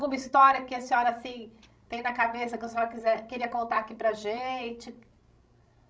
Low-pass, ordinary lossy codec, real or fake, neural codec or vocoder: none; none; fake; codec, 16 kHz, 16 kbps, FreqCodec, larger model